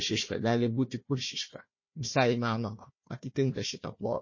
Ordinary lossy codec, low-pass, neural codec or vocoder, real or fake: MP3, 32 kbps; 7.2 kHz; codec, 16 kHz, 1 kbps, FunCodec, trained on Chinese and English, 50 frames a second; fake